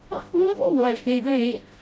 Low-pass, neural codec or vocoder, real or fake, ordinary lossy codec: none; codec, 16 kHz, 0.5 kbps, FreqCodec, smaller model; fake; none